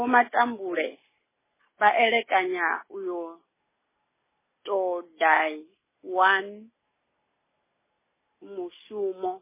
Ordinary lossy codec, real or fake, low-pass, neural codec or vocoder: MP3, 16 kbps; real; 3.6 kHz; none